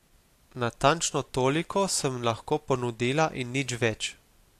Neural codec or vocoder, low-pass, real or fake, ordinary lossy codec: none; 14.4 kHz; real; AAC, 64 kbps